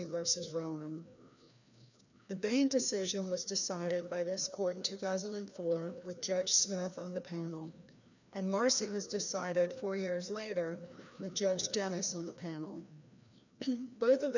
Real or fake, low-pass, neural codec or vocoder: fake; 7.2 kHz; codec, 16 kHz, 1 kbps, FreqCodec, larger model